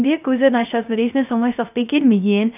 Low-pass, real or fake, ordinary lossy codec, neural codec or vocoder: 3.6 kHz; fake; none; codec, 16 kHz, 0.3 kbps, FocalCodec